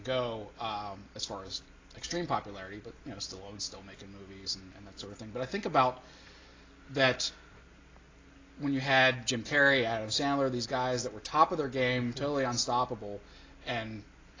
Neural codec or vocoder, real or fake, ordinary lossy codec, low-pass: none; real; AAC, 32 kbps; 7.2 kHz